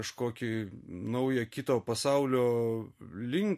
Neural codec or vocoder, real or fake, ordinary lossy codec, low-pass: none; real; MP3, 64 kbps; 14.4 kHz